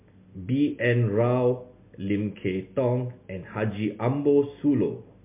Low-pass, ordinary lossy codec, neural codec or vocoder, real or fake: 3.6 kHz; MP3, 24 kbps; none; real